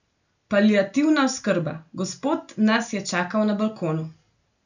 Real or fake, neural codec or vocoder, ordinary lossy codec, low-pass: real; none; none; 7.2 kHz